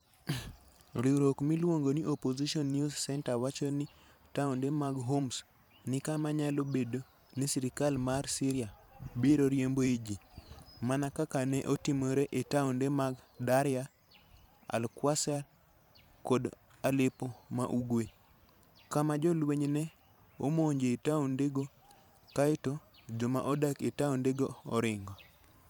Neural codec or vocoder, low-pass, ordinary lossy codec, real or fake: vocoder, 44.1 kHz, 128 mel bands every 512 samples, BigVGAN v2; none; none; fake